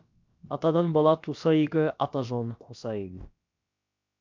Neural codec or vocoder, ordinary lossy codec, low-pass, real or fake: codec, 16 kHz, about 1 kbps, DyCAST, with the encoder's durations; MP3, 64 kbps; 7.2 kHz; fake